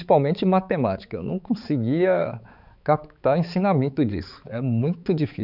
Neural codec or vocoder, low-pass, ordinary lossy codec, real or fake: codec, 16 kHz, 4 kbps, X-Codec, HuBERT features, trained on balanced general audio; 5.4 kHz; none; fake